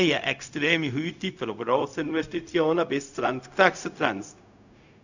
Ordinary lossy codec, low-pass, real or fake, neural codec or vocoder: none; 7.2 kHz; fake; codec, 16 kHz, 0.4 kbps, LongCat-Audio-Codec